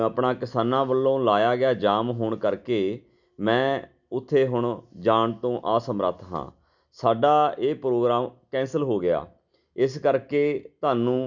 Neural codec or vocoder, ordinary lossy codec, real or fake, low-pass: none; none; real; 7.2 kHz